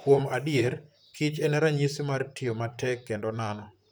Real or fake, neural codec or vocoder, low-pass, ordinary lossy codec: fake; vocoder, 44.1 kHz, 128 mel bands, Pupu-Vocoder; none; none